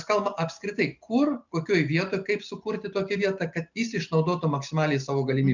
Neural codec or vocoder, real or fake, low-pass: none; real; 7.2 kHz